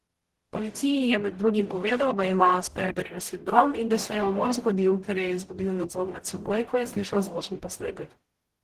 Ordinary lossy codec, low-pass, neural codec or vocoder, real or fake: Opus, 16 kbps; 14.4 kHz; codec, 44.1 kHz, 0.9 kbps, DAC; fake